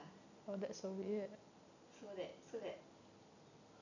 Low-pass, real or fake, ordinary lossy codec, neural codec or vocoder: 7.2 kHz; real; none; none